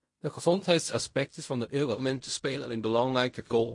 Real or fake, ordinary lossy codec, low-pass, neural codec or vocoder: fake; MP3, 48 kbps; 10.8 kHz; codec, 16 kHz in and 24 kHz out, 0.4 kbps, LongCat-Audio-Codec, fine tuned four codebook decoder